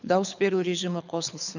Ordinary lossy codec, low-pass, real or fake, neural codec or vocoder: none; 7.2 kHz; fake; codec, 24 kHz, 6 kbps, HILCodec